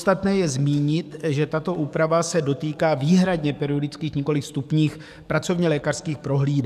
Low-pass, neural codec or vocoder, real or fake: 14.4 kHz; codec, 44.1 kHz, 7.8 kbps, DAC; fake